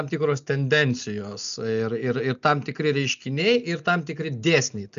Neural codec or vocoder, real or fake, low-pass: none; real; 7.2 kHz